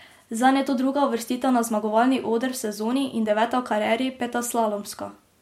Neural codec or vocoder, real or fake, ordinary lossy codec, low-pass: none; real; MP3, 64 kbps; 19.8 kHz